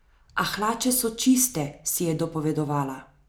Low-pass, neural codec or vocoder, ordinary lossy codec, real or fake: none; none; none; real